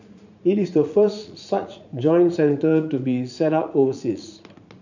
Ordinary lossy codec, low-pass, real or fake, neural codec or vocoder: none; 7.2 kHz; fake; vocoder, 44.1 kHz, 80 mel bands, Vocos